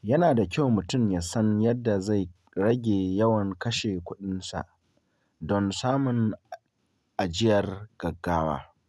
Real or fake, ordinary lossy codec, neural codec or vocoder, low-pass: real; none; none; none